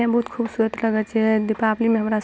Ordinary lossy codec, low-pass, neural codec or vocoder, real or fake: none; none; none; real